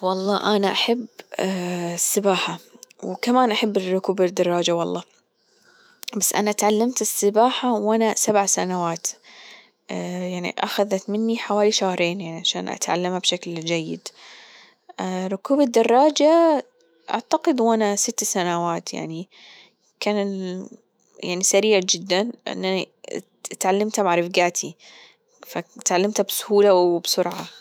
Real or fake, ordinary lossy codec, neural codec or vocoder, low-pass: fake; none; autoencoder, 48 kHz, 128 numbers a frame, DAC-VAE, trained on Japanese speech; none